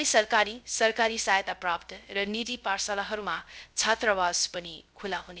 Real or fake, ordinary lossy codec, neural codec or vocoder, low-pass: fake; none; codec, 16 kHz, 0.3 kbps, FocalCodec; none